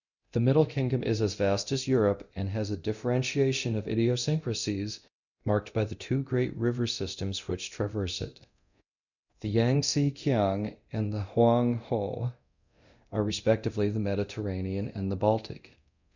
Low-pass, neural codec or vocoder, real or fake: 7.2 kHz; codec, 24 kHz, 0.9 kbps, DualCodec; fake